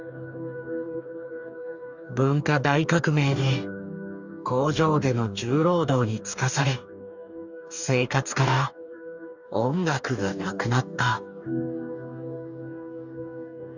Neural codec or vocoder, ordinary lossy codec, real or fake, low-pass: codec, 44.1 kHz, 2.6 kbps, DAC; none; fake; 7.2 kHz